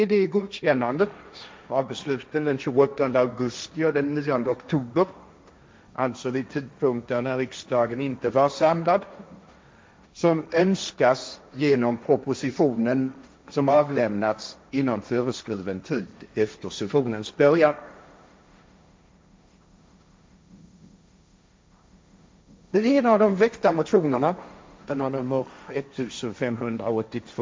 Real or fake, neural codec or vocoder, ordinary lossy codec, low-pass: fake; codec, 16 kHz, 1.1 kbps, Voila-Tokenizer; none; none